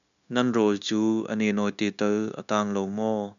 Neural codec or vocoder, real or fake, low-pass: codec, 16 kHz, 0.9 kbps, LongCat-Audio-Codec; fake; 7.2 kHz